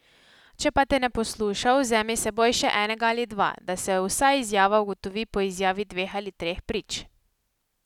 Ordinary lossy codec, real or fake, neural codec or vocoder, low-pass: none; real; none; 19.8 kHz